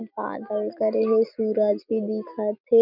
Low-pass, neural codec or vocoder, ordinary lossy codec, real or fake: 5.4 kHz; none; none; real